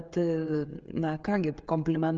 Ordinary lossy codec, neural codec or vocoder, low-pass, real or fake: Opus, 24 kbps; codec, 16 kHz, 16 kbps, FreqCodec, smaller model; 7.2 kHz; fake